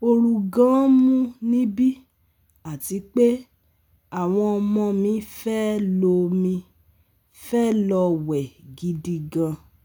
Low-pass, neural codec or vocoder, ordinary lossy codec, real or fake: none; none; none; real